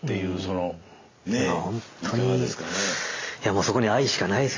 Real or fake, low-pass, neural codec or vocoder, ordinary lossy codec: real; 7.2 kHz; none; AAC, 32 kbps